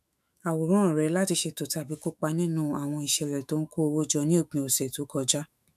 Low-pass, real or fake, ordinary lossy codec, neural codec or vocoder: 14.4 kHz; fake; none; autoencoder, 48 kHz, 128 numbers a frame, DAC-VAE, trained on Japanese speech